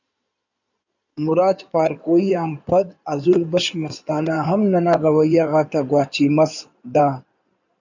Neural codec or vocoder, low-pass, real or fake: codec, 16 kHz in and 24 kHz out, 2.2 kbps, FireRedTTS-2 codec; 7.2 kHz; fake